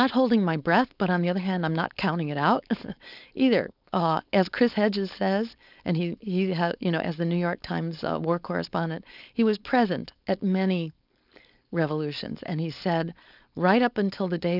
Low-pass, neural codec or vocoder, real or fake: 5.4 kHz; codec, 16 kHz, 4.8 kbps, FACodec; fake